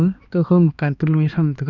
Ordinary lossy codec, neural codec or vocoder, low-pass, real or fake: none; codec, 16 kHz, 2 kbps, X-Codec, HuBERT features, trained on balanced general audio; 7.2 kHz; fake